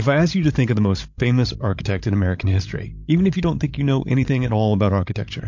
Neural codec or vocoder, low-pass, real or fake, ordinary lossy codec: codec, 16 kHz, 16 kbps, FreqCodec, larger model; 7.2 kHz; fake; MP3, 48 kbps